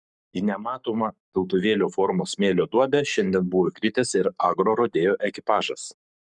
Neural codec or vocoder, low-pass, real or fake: codec, 44.1 kHz, 7.8 kbps, Pupu-Codec; 10.8 kHz; fake